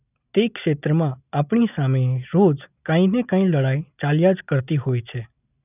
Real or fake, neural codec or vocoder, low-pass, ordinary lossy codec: real; none; 3.6 kHz; none